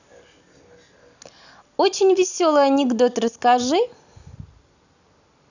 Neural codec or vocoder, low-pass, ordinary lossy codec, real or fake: none; 7.2 kHz; none; real